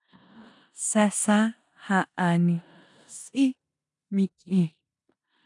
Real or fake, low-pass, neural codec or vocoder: fake; 10.8 kHz; codec, 16 kHz in and 24 kHz out, 0.9 kbps, LongCat-Audio-Codec, four codebook decoder